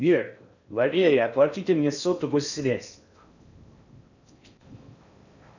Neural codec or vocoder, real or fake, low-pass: codec, 16 kHz in and 24 kHz out, 0.6 kbps, FocalCodec, streaming, 4096 codes; fake; 7.2 kHz